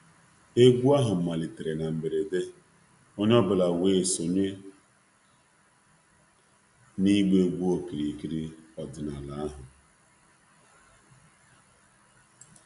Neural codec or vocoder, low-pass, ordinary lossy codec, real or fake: none; 10.8 kHz; none; real